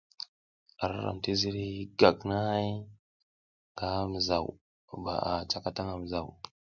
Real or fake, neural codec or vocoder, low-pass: real; none; 7.2 kHz